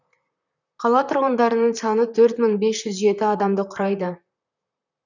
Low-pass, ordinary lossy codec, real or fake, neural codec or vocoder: 7.2 kHz; none; fake; vocoder, 44.1 kHz, 128 mel bands, Pupu-Vocoder